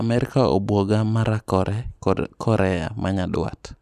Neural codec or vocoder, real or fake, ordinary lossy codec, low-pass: none; real; none; 14.4 kHz